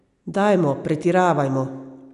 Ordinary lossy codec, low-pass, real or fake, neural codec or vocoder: none; 10.8 kHz; real; none